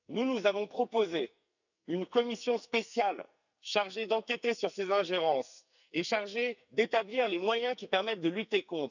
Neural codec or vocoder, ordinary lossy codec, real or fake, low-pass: codec, 44.1 kHz, 2.6 kbps, SNAC; none; fake; 7.2 kHz